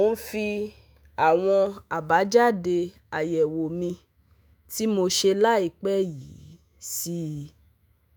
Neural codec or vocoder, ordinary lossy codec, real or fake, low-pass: autoencoder, 48 kHz, 128 numbers a frame, DAC-VAE, trained on Japanese speech; none; fake; none